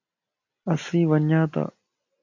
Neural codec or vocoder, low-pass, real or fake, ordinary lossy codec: none; 7.2 kHz; real; MP3, 48 kbps